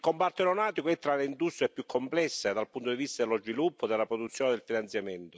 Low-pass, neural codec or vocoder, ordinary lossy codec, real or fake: none; none; none; real